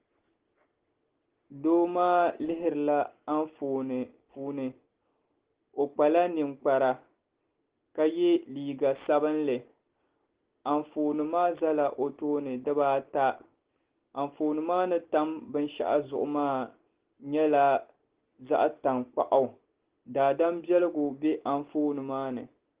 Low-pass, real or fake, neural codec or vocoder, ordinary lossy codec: 3.6 kHz; real; none; Opus, 16 kbps